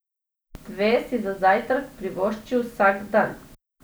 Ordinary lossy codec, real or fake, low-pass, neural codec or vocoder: none; real; none; none